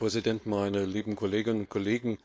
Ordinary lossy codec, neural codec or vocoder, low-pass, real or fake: none; codec, 16 kHz, 4.8 kbps, FACodec; none; fake